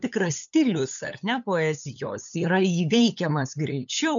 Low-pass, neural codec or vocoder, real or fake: 7.2 kHz; codec, 16 kHz, 8 kbps, FunCodec, trained on LibriTTS, 25 frames a second; fake